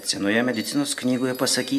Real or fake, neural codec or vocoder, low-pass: real; none; 14.4 kHz